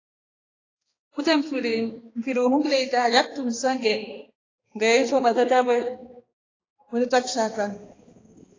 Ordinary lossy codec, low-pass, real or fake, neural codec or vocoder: AAC, 32 kbps; 7.2 kHz; fake; codec, 16 kHz, 2 kbps, X-Codec, HuBERT features, trained on balanced general audio